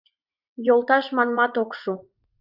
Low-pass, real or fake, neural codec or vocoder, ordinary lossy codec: 5.4 kHz; fake; vocoder, 44.1 kHz, 80 mel bands, Vocos; Opus, 64 kbps